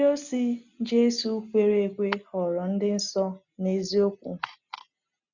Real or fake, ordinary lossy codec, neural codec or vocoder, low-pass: real; none; none; 7.2 kHz